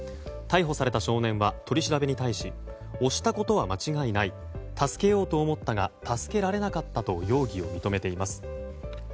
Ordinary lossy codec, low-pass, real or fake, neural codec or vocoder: none; none; real; none